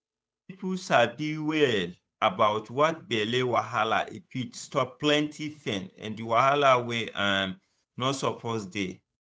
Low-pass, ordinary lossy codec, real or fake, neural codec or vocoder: none; none; fake; codec, 16 kHz, 8 kbps, FunCodec, trained on Chinese and English, 25 frames a second